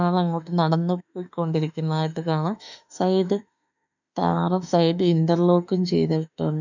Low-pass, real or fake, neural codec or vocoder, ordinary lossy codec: 7.2 kHz; fake; autoencoder, 48 kHz, 32 numbers a frame, DAC-VAE, trained on Japanese speech; none